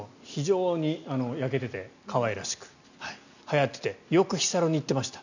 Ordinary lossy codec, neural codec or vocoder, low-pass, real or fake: none; none; 7.2 kHz; real